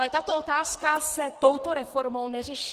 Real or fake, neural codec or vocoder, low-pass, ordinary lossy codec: fake; codec, 44.1 kHz, 2.6 kbps, SNAC; 14.4 kHz; Opus, 16 kbps